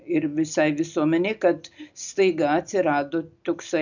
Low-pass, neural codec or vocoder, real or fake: 7.2 kHz; none; real